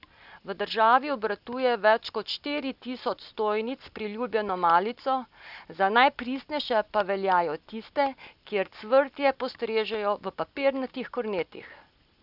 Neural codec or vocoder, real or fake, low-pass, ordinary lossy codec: none; real; 5.4 kHz; none